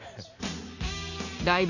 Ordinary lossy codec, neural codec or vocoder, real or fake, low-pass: none; none; real; 7.2 kHz